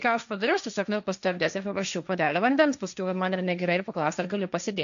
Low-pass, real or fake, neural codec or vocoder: 7.2 kHz; fake; codec, 16 kHz, 1.1 kbps, Voila-Tokenizer